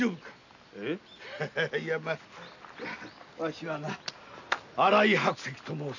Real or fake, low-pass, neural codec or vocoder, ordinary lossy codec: real; 7.2 kHz; none; none